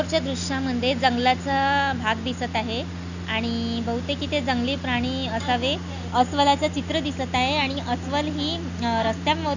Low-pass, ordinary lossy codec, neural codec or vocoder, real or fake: 7.2 kHz; none; none; real